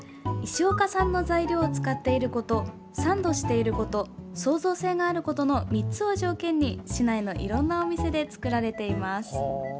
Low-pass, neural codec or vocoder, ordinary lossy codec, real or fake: none; none; none; real